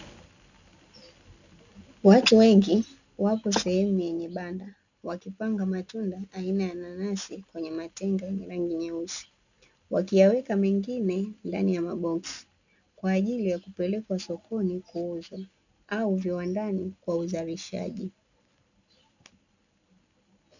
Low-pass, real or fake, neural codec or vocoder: 7.2 kHz; real; none